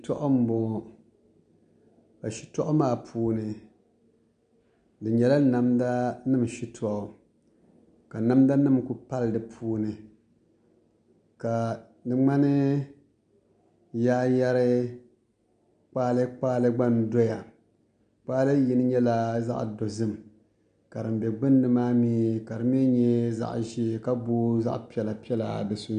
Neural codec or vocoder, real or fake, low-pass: none; real; 9.9 kHz